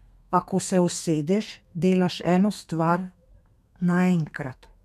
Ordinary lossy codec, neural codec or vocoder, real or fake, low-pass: none; codec, 32 kHz, 1.9 kbps, SNAC; fake; 14.4 kHz